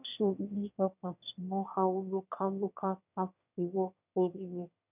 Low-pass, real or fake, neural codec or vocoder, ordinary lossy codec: 3.6 kHz; fake; autoencoder, 22.05 kHz, a latent of 192 numbers a frame, VITS, trained on one speaker; none